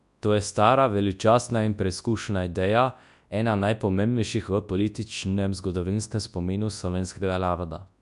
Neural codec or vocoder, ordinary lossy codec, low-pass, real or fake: codec, 24 kHz, 0.9 kbps, WavTokenizer, large speech release; MP3, 96 kbps; 10.8 kHz; fake